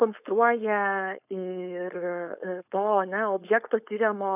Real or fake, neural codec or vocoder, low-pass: fake; codec, 16 kHz, 4.8 kbps, FACodec; 3.6 kHz